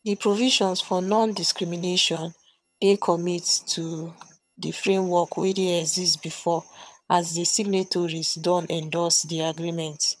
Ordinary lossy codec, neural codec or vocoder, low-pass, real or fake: none; vocoder, 22.05 kHz, 80 mel bands, HiFi-GAN; none; fake